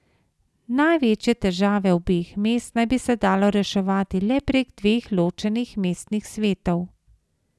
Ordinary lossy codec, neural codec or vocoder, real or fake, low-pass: none; none; real; none